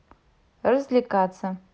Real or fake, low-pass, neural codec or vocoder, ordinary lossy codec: real; none; none; none